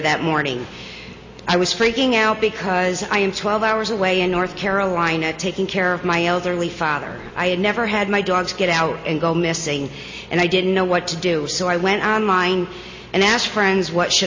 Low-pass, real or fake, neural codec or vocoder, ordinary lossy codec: 7.2 kHz; real; none; MP3, 32 kbps